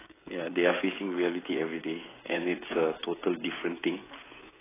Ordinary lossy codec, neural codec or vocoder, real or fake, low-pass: AAC, 16 kbps; codec, 16 kHz, 16 kbps, FreqCodec, smaller model; fake; 3.6 kHz